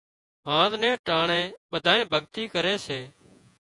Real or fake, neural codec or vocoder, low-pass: fake; vocoder, 48 kHz, 128 mel bands, Vocos; 10.8 kHz